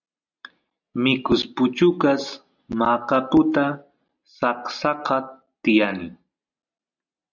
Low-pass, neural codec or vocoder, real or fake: 7.2 kHz; none; real